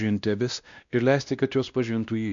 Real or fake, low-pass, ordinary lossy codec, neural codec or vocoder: fake; 7.2 kHz; MP3, 64 kbps; codec, 16 kHz, 1 kbps, X-Codec, WavLM features, trained on Multilingual LibriSpeech